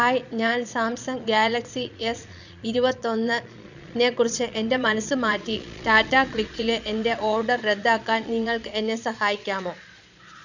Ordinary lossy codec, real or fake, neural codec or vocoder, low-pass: none; fake; vocoder, 22.05 kHz, 80 mel bands, Vocos; 7.2 kHz